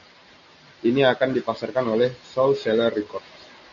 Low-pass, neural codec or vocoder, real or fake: 7.2 kHz; none; real